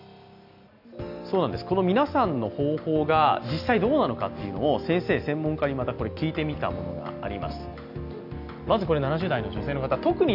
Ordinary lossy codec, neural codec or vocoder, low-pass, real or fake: none; none; 5.4 kHz; real